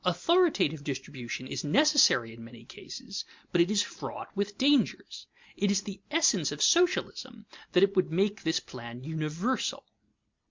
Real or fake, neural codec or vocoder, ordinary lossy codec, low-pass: real; none; MP3, 64 kbps; 7.2 kHz